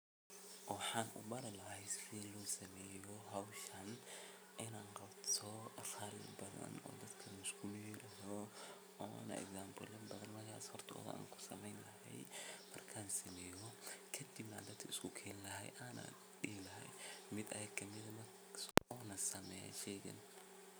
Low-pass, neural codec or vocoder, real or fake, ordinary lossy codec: none; none; real; none